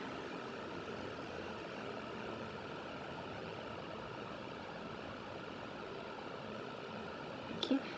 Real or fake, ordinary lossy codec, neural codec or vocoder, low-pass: fake; none; codec, 16 kHz, 16 kbps, FunCodec, trained on Chinese and English, 50 frames a second; none